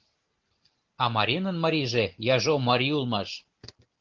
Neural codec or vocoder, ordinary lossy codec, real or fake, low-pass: none; Opus, 16 kbps; real; 7.2 kHz